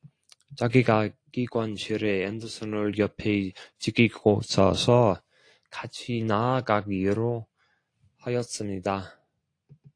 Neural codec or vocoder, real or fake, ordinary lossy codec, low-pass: none; real; AAC, 48 kbps; 9.9 kHz